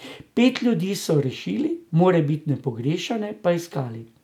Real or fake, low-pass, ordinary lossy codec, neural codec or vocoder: real; 19.8 kHz; none; none